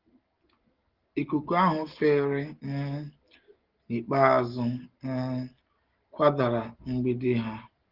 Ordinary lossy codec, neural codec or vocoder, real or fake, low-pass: Opus, 16 kbps; none; real; 5.4 kHz